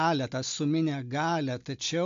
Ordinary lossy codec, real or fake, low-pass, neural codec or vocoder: AAC, 64 kbps; real; 7.2 kHz; none